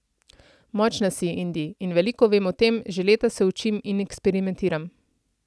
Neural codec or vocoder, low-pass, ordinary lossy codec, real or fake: none; none; none; real